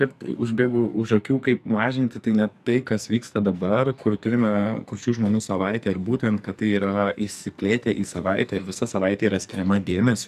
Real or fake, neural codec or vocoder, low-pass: fake; codec, 44.1 kHz, 2.6 kbps, SNAC; 14.4 kHz